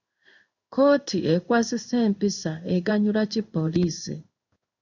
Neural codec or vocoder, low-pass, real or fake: codec, 16 kHz in and 24 kHz out, 1 kbps, XY-Tokenizer; 7.2 kHz; fake